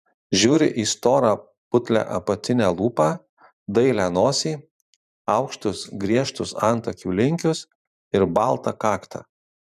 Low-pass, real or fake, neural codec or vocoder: 14.4 kHz; fake; vocoder, 44.1 kHz, 128 mel bands every 512 samples, BigVGAN v2